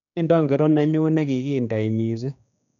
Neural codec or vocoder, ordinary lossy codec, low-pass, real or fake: codec, 16 kHz, 2 kbps, X-Codec, HuBERT features, trained on general audio; none; 7.2 kHz; fake